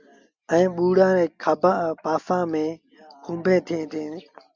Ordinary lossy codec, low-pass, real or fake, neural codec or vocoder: Opus, 64 kbps; 7.2 kHz; real; none